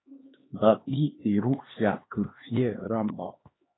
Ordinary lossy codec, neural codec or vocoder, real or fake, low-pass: AAC, 16 kbps; codec, 16 kHz, 2 kbps, X-Codec, HuBERT features, trained on LibriSpeech; fake; 7.2 kHz